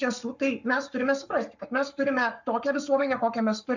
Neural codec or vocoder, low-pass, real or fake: codec, 44.1 kHz, 7.8 kbps, Pupu-Codec; 7.2 kHz; fake